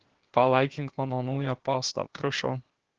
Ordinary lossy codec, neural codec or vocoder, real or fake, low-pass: Opus, 16 kbps; codec, 16 kHz, 0.8 kbps, ZipCodec; fake; 7.2 kHz